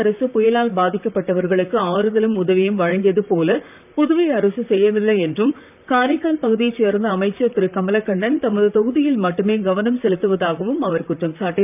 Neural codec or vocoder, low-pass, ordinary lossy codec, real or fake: vocoder, 44.1 kHz, 128 mel bands, Pupu-Vocoder; 3.6 kHz; none; fake